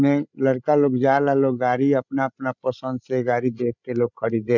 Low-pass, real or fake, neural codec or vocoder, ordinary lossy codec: 7.2 kHz; fake; codec, 16 kHz, 16 kbps, FreqCodec, larger model; none